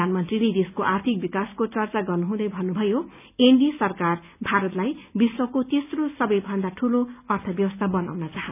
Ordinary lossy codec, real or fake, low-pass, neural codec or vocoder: none; real; 3.6 kHz; none